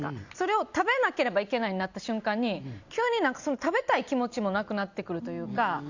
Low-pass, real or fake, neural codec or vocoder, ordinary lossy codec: 7.2 kHz; real; none; Opus, 64 kbps